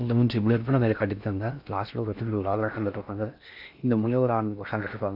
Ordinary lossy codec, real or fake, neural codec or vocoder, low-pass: none; fake; codec, 16 kHz in and 24 kHz out, 0.6 kbps, FocalCodec, streaming, 4096 codes; 5.4 kHz